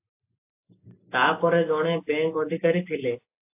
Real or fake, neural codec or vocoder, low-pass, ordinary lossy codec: real; none; 3.6 kHz; AAC, 32 kbps